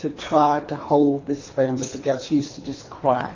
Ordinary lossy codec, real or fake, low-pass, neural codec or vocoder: AAC, 32 kbps; fake; 7.2 kHz; codec, 24 kHz, 3 kbps, HILCodec